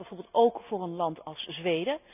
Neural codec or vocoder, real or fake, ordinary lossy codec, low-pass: none; real; none; 3.6 kHz